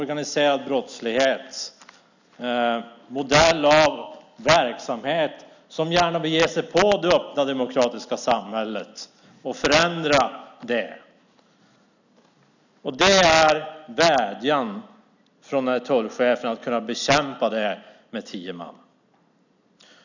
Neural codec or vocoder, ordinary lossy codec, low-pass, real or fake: none; none; 7.2 kHz; real